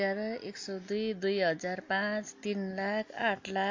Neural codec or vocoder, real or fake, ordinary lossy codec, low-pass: none; real; MP3, 48 kbps; 7.2 kHz